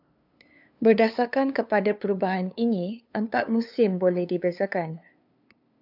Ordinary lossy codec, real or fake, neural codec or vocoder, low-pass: AAC, 48 kbps; fake; codec, 16 kHz, 2 kbps, FunCodec, trained on LibriTTS, 25 frames a second; 5.4 kHz